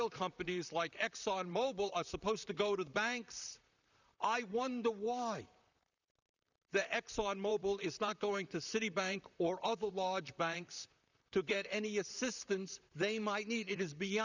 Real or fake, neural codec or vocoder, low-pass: fake; vocoder, 44.1 kHz, 128 mel bands, Pupu-Vocoder; 7.2 kHz